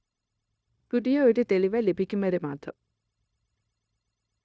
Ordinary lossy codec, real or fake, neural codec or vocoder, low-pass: none; fake; codec, 16 kHz, 0.9 kbps, LongCat-Audio-Codec; none